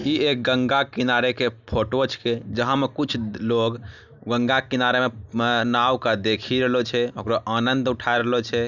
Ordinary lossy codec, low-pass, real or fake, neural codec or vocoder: none; 7.2 kHz; real; none